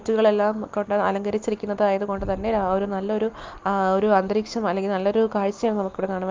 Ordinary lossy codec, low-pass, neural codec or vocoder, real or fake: Opus, 32 kbps; 7.2 kHz; none; real